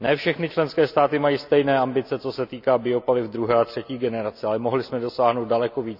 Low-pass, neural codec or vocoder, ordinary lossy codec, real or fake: 5.4 kHz; none; none; real